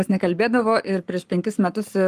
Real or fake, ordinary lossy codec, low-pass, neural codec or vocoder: fake; Opus, 32 kbps; 14.4 kHz; codec, 44.1 kHz, 7.8 kbps, Pupu-Codec